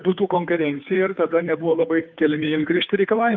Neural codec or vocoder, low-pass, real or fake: codec, 16 kHz, 2 kbps, FunCodec, trained on Chinese and English, 25 frames a second; 7.2 kHz; fake